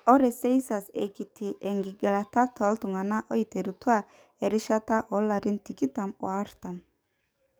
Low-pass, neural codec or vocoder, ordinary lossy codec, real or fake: none; codec, 44.1 kHz, 7.8 kbps, DAC; none; fake